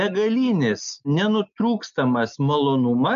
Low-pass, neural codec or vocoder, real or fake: 7.2 kHz; none; real